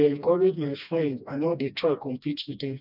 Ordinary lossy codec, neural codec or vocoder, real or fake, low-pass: none; codec, 16 kHz, 1 kbps, FreqCodec, smaller model; fake; 5.4 kHz